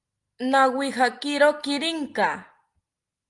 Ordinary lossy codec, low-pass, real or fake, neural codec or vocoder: Opus, 32 kbps; 10.8 kHz; real; none